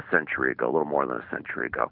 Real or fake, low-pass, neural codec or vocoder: real; 5.4 kHz; none